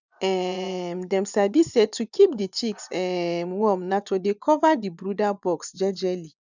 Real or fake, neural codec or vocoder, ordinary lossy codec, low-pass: fake; vocoder, 24 kHz, 100 mel bands, Vocos; none; 7.2 kHz